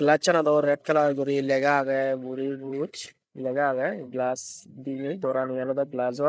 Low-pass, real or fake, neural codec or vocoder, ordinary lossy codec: none; fake; codec, 16 kHz, 8 kbps, FreqCodec, larger model; none